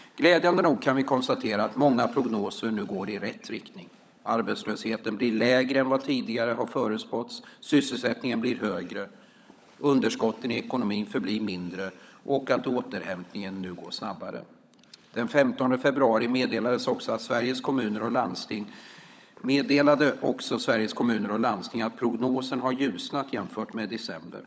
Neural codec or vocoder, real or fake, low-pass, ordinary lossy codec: codec, 16 kHz, 16 kbps, FunCodec, trained on LibriTTS, 50 frames a second; fake; none; none